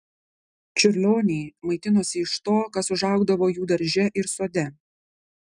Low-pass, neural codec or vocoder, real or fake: 10.8 kHz; none; real